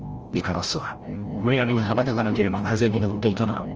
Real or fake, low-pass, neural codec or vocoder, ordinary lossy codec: fake; 7.2 kHz; codec, 16 kHz, 0.5 kbps, FreqCodec, larger model; Opus, 24 kbps